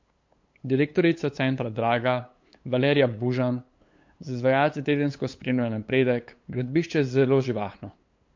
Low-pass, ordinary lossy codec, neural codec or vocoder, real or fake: 7.2 kHz; MP3, 48 kbps; codec, 16 kHz, 8 kbps, FunCodec, trained on LibriTTS, 25 frames a second; fake